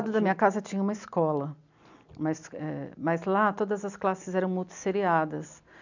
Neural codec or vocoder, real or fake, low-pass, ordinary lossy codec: none; real; 7.2 kHz; none